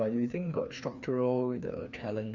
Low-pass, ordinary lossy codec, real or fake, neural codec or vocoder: 7.2 kHz; none; fake; codec, 16 kHz, 2 kbps, FreqCodec, larger model